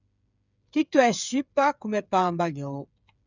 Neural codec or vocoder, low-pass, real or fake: codec, 16 kHz, 8 kbps, FreqCodec, smaller model; 7.2 kHz; fake